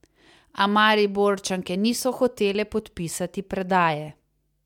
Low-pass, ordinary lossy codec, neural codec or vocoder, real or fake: 19.8 kHz; MP3, 96 kbps; none; real